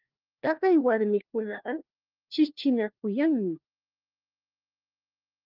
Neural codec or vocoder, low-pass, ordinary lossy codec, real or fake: codec, 16 kHz, 1 kbps, FunCodec, trained on LibriTTS, 50 frames a second; 5.4 kHz; Opus, 32 kbps; fake